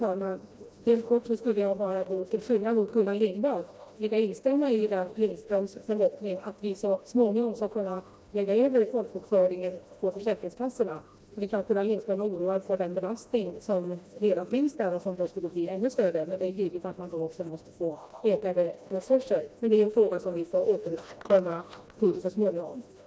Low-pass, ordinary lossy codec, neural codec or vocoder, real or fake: none; none; codec, 16 kHz, 1 kbps, FreqCodec, smaller model; fake